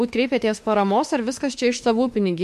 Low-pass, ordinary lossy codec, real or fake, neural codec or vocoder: 14.4 kHz; MP3, 64 kbps; fake; autoencoder, 48 kHz, 32 numbers a frame, DAC-VAE, trained on Japanese speech